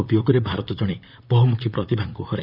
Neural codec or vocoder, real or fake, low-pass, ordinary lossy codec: vocoder, 44.1 kHz, 128 mel bands, Pupu-Vocoder; fake; 5.4 kHz; none